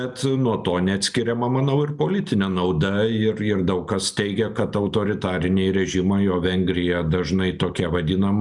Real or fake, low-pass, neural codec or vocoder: real; 10.8 kHz; none